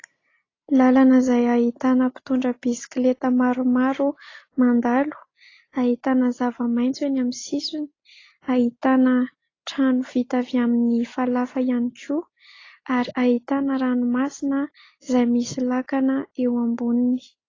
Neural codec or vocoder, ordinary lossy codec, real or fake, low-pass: none; AAC, 32 kbps; real; 7.2 kHz